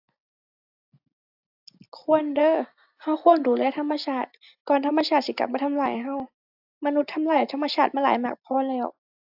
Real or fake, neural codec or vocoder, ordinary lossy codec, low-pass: real; none; AAC, 48 kbps; 5.4 kHz